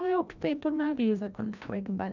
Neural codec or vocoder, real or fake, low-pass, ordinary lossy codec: codec, 16 kHz, 1 kbps, FreqCodec, larger model; fake; 7.2 kHz; none